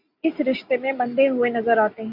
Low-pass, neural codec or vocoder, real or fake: 5.4 kHz; none; real